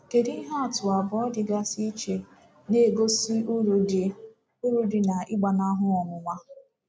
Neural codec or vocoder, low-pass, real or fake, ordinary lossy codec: none; none; real; none